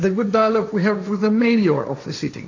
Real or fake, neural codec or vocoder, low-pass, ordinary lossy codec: fake; codec, 16 kHz, 1.1 kbps, Voila-Tokenizer; 7.2 kHz; AAC, 48 kbps